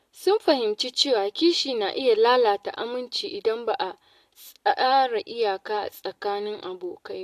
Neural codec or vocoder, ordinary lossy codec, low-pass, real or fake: none; AAC, 64 kbps; 14.4 kHz; real